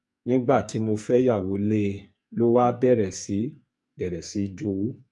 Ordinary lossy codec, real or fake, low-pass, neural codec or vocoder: MP3, 64 kbps; fake; 10.8 kHz; codec, 44.1 kHz, 2.6 kbps, SNAC